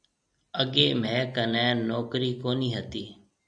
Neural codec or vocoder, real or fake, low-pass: none; real; 9.9 kHz